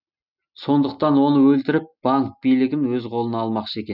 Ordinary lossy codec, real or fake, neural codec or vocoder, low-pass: none; real; none; 5.4 kHz